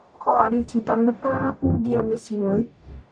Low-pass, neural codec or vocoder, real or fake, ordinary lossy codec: 9.9 kHz; codec, 44.1 kHz, 0.9 kbps, DAC; fake; none